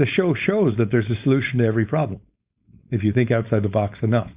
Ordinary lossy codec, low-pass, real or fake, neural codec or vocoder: Opus, 64 kbps; 3.6 kHz; fake; codec, 16 kHz, 4.8 kbps, FACodec